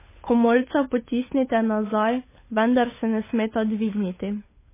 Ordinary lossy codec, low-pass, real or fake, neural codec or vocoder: MP3, 16 kbps; 3.6 kHz; fake; codec, 16 kHz, 8 kbps, FunCodec, trained on Chinese and English, 25 frames a second